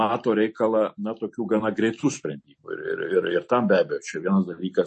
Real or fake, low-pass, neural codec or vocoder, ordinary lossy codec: real; 10.8 kHz; none; MP3, 32 kbps